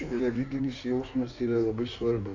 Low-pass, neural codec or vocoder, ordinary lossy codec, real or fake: 7.2 kHz; codec, 16 kHz in and 24 kHz out, 1.1 kbps, FireRedTTS-2 codec; MP3, 64 kbps; fake